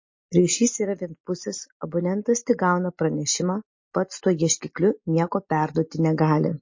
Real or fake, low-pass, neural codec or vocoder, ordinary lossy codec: real; 7.2 kHz; none; MP3, 32 kbps